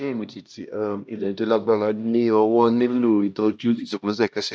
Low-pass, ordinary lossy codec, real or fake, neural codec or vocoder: none; none; fake; codec, 16 kHz, 1 kbps, X-Codec, HuBERT features, trained on LibriSpeech